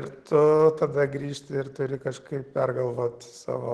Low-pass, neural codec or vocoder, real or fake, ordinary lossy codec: 10.8 kHz; none; real; Opus, 16 kbps